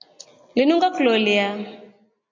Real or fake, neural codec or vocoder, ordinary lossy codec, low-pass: real; none; MP3, 48 kbps; 7.2 kHz